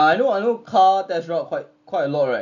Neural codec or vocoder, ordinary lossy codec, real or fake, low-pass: none; none; real; 7.2 kHz